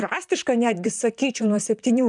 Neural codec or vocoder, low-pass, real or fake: vocoder, 44.1 kHz, 128 mel bands, Pupu-Vocoder; 10.8 kHz; fake